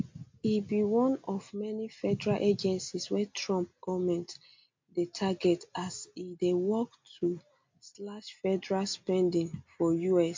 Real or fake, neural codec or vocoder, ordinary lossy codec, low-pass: real; none; MP3, 48 kbps; 7.2 kHz